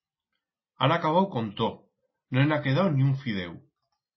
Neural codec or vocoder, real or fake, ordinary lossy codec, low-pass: none; real; MP3, 24 kbps; 7.2 kHz